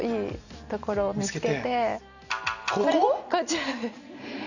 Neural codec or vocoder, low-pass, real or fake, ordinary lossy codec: none; 7.2 kHz; real; none